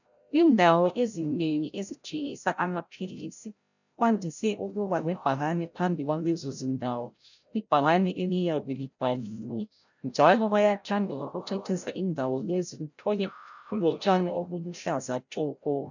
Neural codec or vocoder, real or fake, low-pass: codec, 16 kHz, 0.5 kbps, FreqCodec, larger model; fake; 7.2 kHz